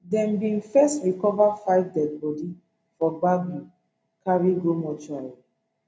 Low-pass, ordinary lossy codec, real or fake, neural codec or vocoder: none; none; real; none